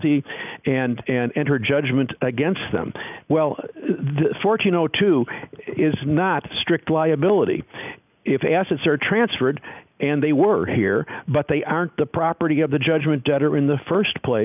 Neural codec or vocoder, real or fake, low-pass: none; real; 3.6 kHz